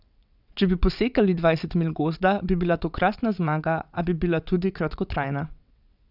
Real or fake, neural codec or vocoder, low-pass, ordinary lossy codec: fake; vocoder, 22.05 kHz, 80 mel bands, WaveNeXt; 5.4 kHz; none